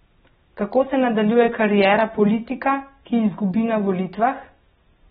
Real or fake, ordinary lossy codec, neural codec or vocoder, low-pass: real; AAC, 16 kbps; none; 19.8 kHz